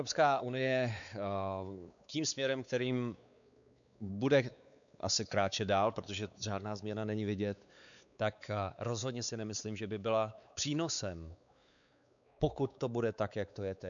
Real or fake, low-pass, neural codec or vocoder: fake; 7.2 kHz; codec, 16 kHz, 4 kbps, X-Codec, WavLM features, trained on Multilingual LibriSpeech